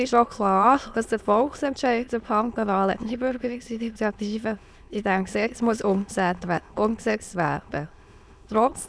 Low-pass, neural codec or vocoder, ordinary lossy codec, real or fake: none; autoencoder, 22.05 kHz, a latent of 192 numbers a frame, VITS, trained on many speakers; none; fake